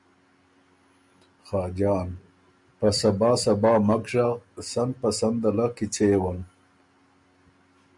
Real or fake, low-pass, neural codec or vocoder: real; 10.8 kHz; none